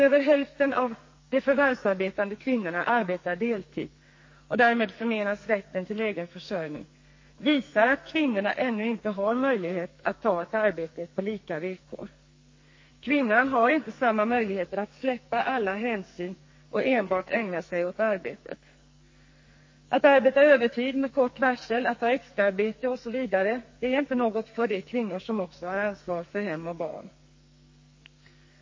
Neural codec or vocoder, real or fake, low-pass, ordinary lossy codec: codec, 44.1 kHz, 2.6 kbps, SNAC; fake; 7.2 kHz; MP3, 32 kbps